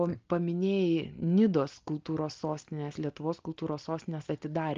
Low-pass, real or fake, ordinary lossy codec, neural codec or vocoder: 7.2 kHz; real; Opus, 24 kbps; none